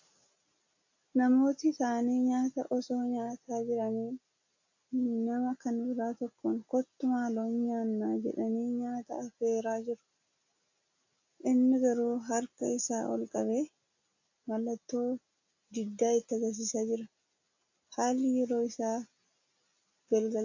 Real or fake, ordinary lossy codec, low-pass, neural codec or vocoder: real; AAC, 48 kbps; 7.2 kHz; none